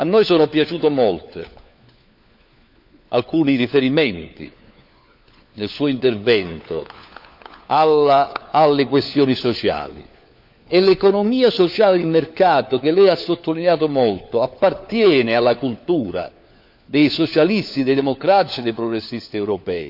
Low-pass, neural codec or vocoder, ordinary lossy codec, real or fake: 5.4 kHz; codec, 16 kHz, 4 kbps, FunCodec, trained on LibriTTS, 50 frames a second; none; fake